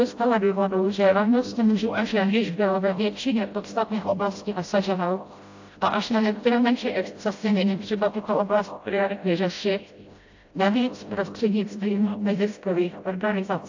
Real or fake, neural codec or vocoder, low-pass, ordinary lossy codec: fake; codec, 16 kHz, 0.5 kbps, FreqCodec, smaller model; 7.2 kHz; AAC, 48 kbps